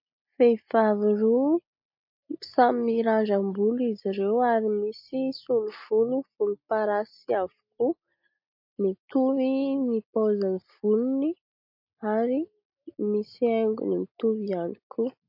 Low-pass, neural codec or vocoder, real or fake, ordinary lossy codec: 5.4 kHz; none; real; MP3, 32 kbps